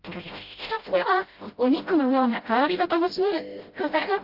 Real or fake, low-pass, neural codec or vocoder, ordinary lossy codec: fake; 5.4 kHz; codec, 16 kHz, 0.5 kbps, FreqCodec, smaller model; Opus, 32 kbps